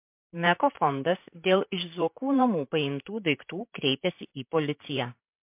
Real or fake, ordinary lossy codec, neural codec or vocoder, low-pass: fake; MP3, 32 kbps; vocoder, 44.1 kHz, 128 mel bands, Pupu-Vocoder; 3.6 kHz